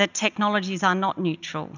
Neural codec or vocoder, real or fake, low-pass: none; real; 7.2 kHz